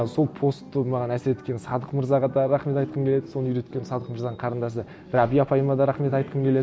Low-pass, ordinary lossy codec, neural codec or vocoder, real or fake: none; none; none; real